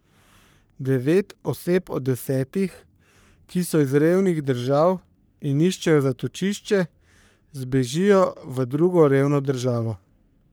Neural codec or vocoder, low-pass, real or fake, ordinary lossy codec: codec, 44.1 kHz, 3.4 kbps, Pupu-Codec; none; fake; none